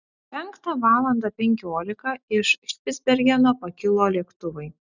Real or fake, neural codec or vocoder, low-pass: real; none; 7.2 kHz